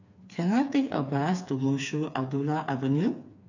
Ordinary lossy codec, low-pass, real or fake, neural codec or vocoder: none; 7.2 kHz; fake; codec, 16 kHz, 4 kbps, FreqCodec, smaller model